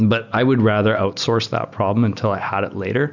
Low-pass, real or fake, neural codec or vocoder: 7.2 kHz; real; none